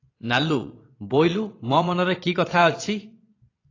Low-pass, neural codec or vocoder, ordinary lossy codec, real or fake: 7.2 kHz; vocoder, 22.05 kHz, 80 mel bands, Vocos; AAC, 32 kbps; fake